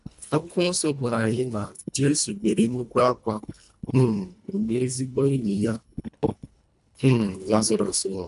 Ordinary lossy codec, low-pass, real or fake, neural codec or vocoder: none; 10.8 kHz; fake; codec, 24 kHz, 1.5 kbps, HILCodec